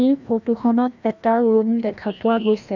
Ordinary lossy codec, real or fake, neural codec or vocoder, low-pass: none; fake; codec, 16 kHz, 1 kbps, FreqCodec, larger model; 7.2 kHz